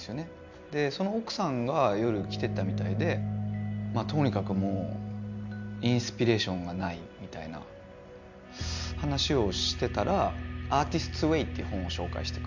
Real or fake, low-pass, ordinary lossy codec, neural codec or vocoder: real; 7.2 kHz; none; none